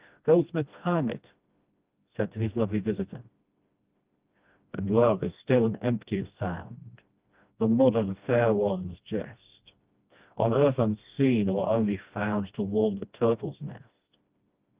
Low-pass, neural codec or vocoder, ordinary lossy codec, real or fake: 3.6 kHz; codec, 16 kHz, 1 kbps, FreqCodec, smaller model; Opus, 32 kbps; fake